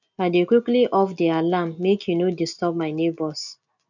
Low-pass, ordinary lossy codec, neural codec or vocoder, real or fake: 7.2 kHz; none; none; real